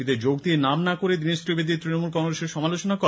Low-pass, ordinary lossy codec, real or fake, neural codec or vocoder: none; none; real; none